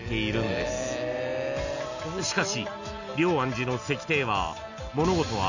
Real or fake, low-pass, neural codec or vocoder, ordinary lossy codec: real; 7.2 kHz; none; none